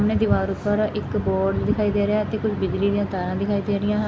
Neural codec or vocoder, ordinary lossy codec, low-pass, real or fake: none; none; none; real